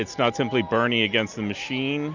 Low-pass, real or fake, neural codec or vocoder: 7.2 kHz; real; none